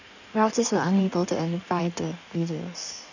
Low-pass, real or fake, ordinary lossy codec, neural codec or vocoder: 7.2 kHz; fake; none; codec, 16 kHz in and 24 kHz out, 1.1 kbps, FireRedTTS-2 codec